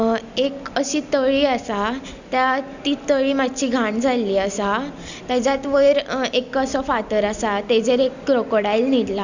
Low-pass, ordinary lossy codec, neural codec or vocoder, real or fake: 7.2 kHz; none; none; real